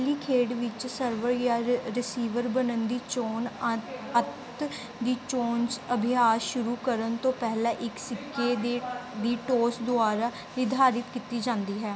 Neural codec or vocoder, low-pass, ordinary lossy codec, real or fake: none; none; none; real